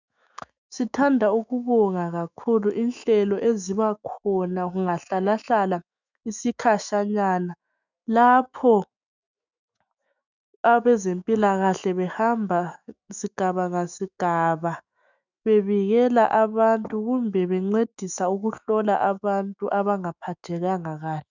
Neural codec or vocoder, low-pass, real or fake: autoencoder, 48 kHz, 128 numbers a frame, DAC-VAE, trained on Japanese speech; 7.2 kHz; fake